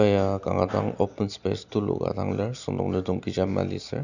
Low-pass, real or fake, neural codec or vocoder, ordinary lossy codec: 7.2 kHz; real; none; none